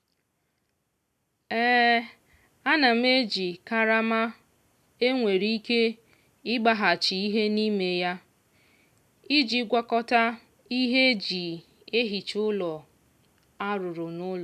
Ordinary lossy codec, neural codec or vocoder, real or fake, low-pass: none; none; real; 14.4 kHz